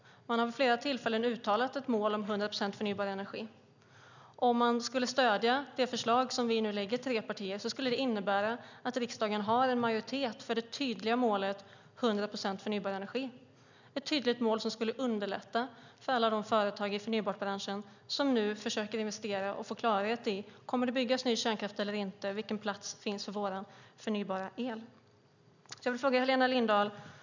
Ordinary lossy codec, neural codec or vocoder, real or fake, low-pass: none; none; real; 7.2 kHz